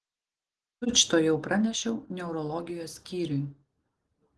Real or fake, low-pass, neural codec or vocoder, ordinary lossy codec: real; 10.8 kHz; none; Opus, 16 kbps